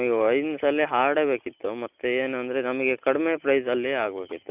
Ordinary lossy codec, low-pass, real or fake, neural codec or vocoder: none; 3.6 kHz; real; none